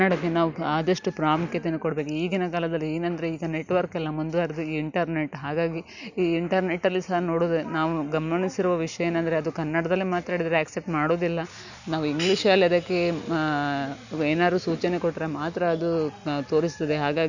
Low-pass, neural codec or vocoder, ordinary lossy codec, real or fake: 7.2 kHz; none; none; real